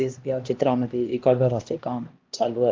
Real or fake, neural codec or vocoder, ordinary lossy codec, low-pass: fake; codec, 16 kHz, 1 kbps, X-Codec, HuBERT features, trained on LibriSpeech; Opus, 32 kbps; 7.2 kHz